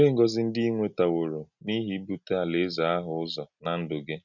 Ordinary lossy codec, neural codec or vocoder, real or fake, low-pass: none; none; real; 7.2 kHz